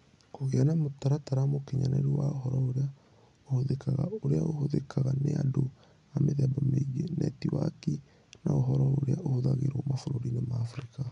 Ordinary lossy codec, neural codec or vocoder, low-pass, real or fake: none; none; 10.8 kHz; real